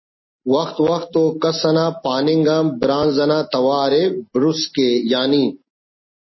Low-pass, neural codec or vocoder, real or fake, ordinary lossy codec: 7.2 kHz; none; real; MP3, 24 kbps